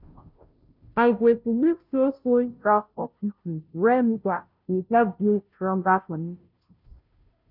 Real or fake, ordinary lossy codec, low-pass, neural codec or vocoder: fake; none; 5.4 kHz; codec, 16 kHz, 0.5 kbps, FunCodec, trained on Chinese and English, 25 frames a second